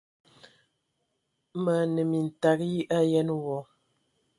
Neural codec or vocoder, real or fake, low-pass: none; real; 10.8 kHz